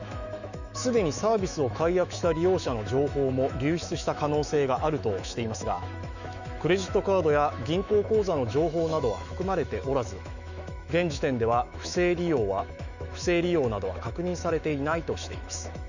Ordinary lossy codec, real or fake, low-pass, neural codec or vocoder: none; fake; 7.2 kHz; autoencoder, 48 kHz, 128 numbers a frame, DAC-VAE, trained on Japanese speech